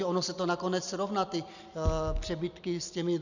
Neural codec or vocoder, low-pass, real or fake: none; 7.2 kHz; real